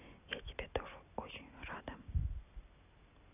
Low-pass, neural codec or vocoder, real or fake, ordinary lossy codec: 3.6 kHz; none; real; none